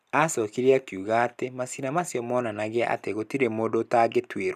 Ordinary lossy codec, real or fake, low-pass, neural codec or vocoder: none; real; 14.4 kHz; none